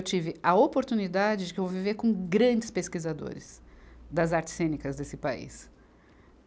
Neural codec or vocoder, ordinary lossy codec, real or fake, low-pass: none; none; real; none